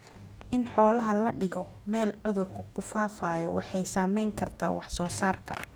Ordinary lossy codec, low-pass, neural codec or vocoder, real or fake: none; none; codec, 44.1 kHz, 2.6 kbps, DAC; fake